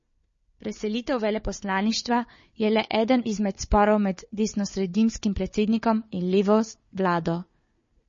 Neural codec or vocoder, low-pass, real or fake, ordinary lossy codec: codec, 16 kHz, 4 kbps, FunCodec, trained on Chinese and English, 50 frames a second; 7.2 kHz; fake; MP3, 32 kbps